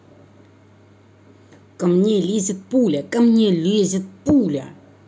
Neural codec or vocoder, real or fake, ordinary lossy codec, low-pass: none; real; none; none